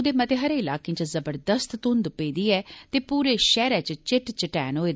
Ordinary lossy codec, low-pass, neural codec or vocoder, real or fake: none; none; none; real